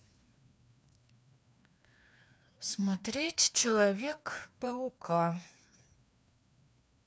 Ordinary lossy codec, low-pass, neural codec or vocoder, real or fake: none; none; codec, 16 kHz, 2 kbps, FreqCodec, larger model; fake